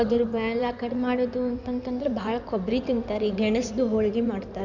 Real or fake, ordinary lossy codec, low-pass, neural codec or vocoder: fake; none; 7.2 kHz; codec, 16 kHz in and 24 kHz out, 2.2 kbps, FireRedTTS-2 codec